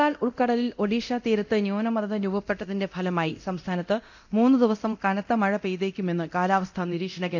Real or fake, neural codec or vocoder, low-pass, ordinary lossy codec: fake; codec, 24 kHz, 0.9 kbps, DualCodec; 7.2 kHz; none